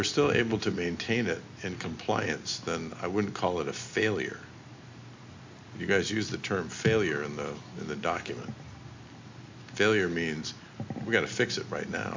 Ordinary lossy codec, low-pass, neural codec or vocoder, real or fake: MP3, 64 kbps; 7.2 kHz; none; real